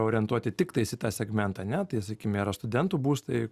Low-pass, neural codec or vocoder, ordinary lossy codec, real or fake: 14.4 kHz; none; Opus, 64 kbps; real